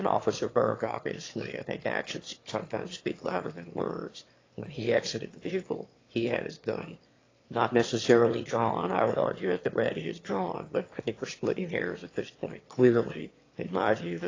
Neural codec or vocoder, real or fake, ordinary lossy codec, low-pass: autoencoder, 22.05 kHz, a latent of 192 numbers a frame, VITS, trained on one speaker; fake; AAC, 32 kbps; 7.2 kHz